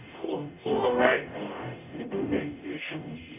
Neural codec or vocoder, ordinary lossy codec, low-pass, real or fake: codec, 44.1 kHz, 0.9 kbps, DAC; none; 3.6 kHz; fake